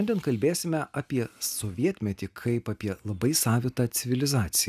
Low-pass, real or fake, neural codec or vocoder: 14.4 kHz; real; none